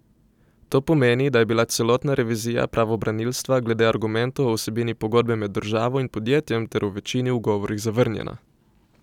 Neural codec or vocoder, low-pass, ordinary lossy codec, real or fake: none; 19.8 kHz; none; real